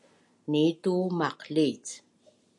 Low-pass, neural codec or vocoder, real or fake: 10.8 kHz; none; real